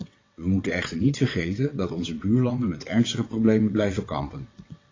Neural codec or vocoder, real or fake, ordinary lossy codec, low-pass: vocoder, 22.05 kHz, 80 mel bands, WaveNeXt; fake; AAC, 32 kbps; 7.2 kHz